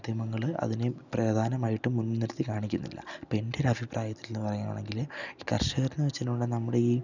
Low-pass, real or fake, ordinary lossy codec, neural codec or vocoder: 7.2 kHz; real; none; none